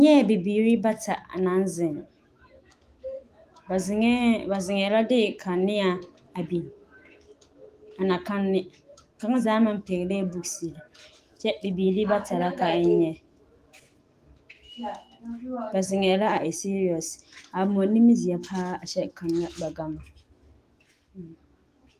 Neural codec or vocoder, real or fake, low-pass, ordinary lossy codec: autoencoder, 48 kHz, 128 numbers a frame, DAC-VAE, trained on Japanese speech; fake; 14.4 kHz; Opus, 24 kbps